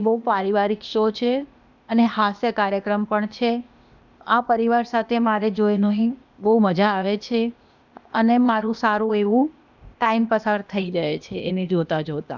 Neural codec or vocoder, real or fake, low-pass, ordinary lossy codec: codec, 16 kHz, 0.8 kbps, ZipCodec; fake; 7.2 kHz; none